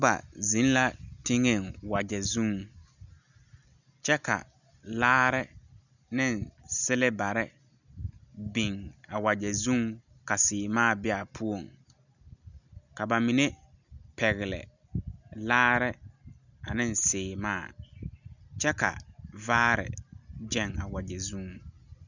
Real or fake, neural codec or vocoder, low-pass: real; none; 7.2 kHz